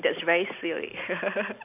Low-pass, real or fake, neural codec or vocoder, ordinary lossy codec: 3.6 kHz; real; none; none